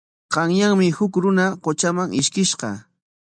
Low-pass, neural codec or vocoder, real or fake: 9.9 kHz; none; real